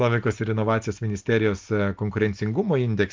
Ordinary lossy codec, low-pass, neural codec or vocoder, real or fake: Opus, 32 kbps; 7.2 kHz; none; real